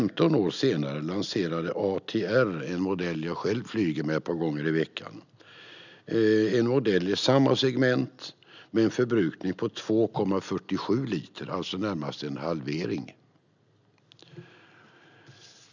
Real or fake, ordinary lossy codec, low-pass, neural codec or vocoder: real; none; 7.2 kHz; none